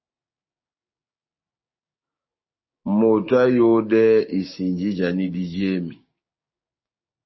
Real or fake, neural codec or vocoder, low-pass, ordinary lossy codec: fake; codec, 16 kHz, 6 kbps, DAC; 7.2 kHz; MP3, 24 kbps